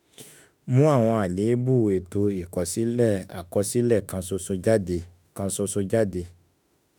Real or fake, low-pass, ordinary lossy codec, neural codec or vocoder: fake; none; none; autoencoder, 48 kHz, 32 numbers a frame, DAC-VAE, trained on Japanese speech